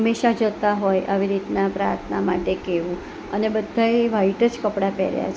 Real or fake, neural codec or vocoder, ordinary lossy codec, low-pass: real; none; none; none